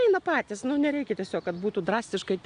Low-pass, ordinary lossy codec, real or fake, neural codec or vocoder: 9.9 kHz; AAC, 96 kbps; real; none